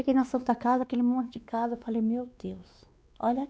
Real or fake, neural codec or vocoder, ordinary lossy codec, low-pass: fake; codec, 16 kHz, 2 kbps, X-Codec, WavLM features, trained on Multilingual LibriSpeech; none; none